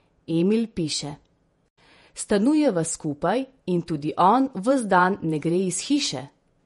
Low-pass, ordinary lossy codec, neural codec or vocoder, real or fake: 19.8 kHz; MP3, 48 kbps; vocoder, 48 kHz, 128 mel bands, Vocos; fake